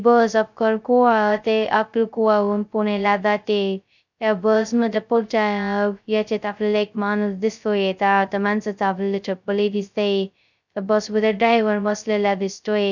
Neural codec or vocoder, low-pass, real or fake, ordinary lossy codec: codec, 16 kHz, 0.2 kbps, FocalCodec; 7.2 kHz; fake; none